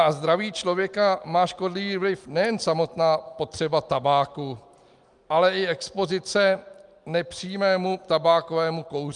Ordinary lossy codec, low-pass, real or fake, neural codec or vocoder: Opus, 32 kbps; 10.8 kHz; real; none